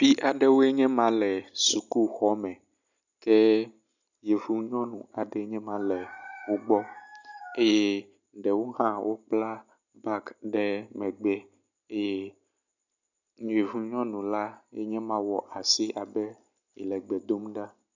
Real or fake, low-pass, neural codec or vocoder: real; 7.2 kHz; none